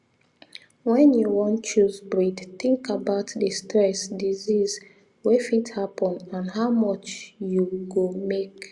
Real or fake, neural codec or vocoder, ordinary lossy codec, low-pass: real; none; Opus, 64 kbps; 10.8 kHz